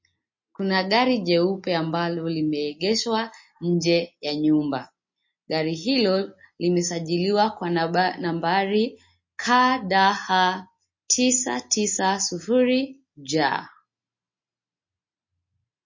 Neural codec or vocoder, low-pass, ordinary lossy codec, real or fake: none; 7.2 kHz; MP3, 32 kbps; real